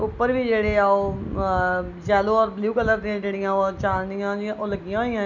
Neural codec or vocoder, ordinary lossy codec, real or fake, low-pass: none; none; real; 7.2 kHz